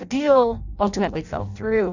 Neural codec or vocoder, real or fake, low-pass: codec, 16 kHz in and 24 kHz out, 0.6 kbps, FireRedTTS-2 codec; fake; 7.2 kHz